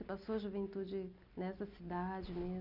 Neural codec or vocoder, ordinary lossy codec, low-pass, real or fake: none; none; 5.4 kHz; real